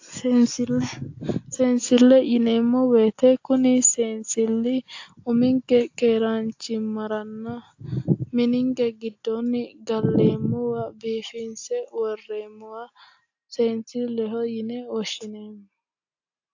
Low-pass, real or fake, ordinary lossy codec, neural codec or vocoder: 7.2 kHz; real; AAC, 48 kbps; none